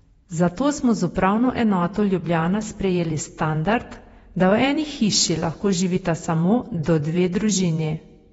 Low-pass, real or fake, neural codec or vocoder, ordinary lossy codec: 19.8 kHz; fake; vocoder, 48 kHz, 128 mel bands, Vocos; AAC, 24 kbps